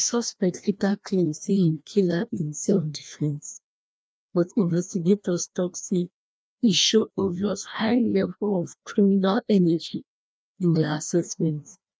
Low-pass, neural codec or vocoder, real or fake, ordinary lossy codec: none; codec, 16 kHz, 1 kbps, FreqCodec, larger model; fake; none